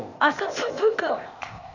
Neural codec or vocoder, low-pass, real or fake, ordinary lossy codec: codec, 16 kHz, 0.8 kbps, ZipCodec; 7.2 kHz; fake; none